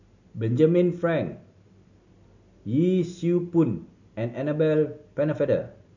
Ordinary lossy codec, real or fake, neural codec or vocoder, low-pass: none; real; none; 7.2 kHz